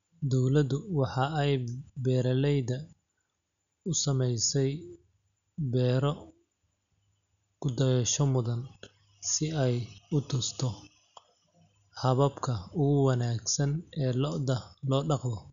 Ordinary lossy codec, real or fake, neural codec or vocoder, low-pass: none; real; none; 7.2 kHz